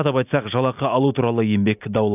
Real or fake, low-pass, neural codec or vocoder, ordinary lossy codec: real; 3.6 kHz; none; AAC, 32 kbps